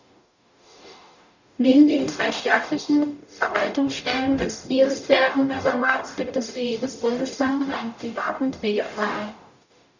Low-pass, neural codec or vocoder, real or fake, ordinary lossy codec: 7.2 kHz; codec, 44.1 kHz, 0.9 kbps, DAC; fake; none